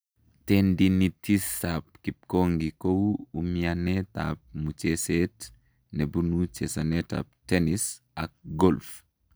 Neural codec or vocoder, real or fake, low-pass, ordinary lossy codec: none; real; none; none